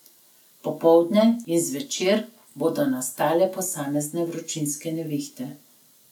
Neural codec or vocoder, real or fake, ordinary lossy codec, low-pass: none; real; none; 19.8 kHz